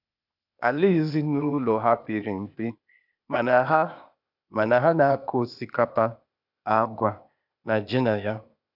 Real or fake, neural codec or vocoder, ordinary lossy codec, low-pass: fake; codec, 16 kHz, 0.8 kbps, ZipCodec; none; 5.4 kHz